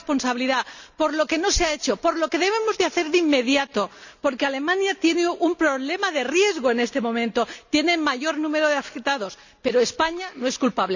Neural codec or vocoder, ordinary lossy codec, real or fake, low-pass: none; none; real; 7.2 kHz